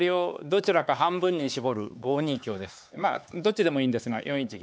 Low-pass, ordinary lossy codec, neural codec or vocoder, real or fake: none; none; codec, 16 kHz, 4 kbps, X-Codec, HuBERT features, trained on LibriSpeech; fake